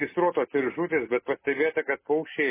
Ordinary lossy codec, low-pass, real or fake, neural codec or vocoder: MP3, 16 kbps; 3.6 kHz; real; none